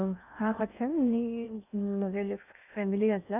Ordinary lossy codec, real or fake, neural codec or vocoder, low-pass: none; fake; codec, 16 kHz in and 24 kHz out, 0.6 kbps, FocalCodec, streaming, 2048 codes; 3.6 kHz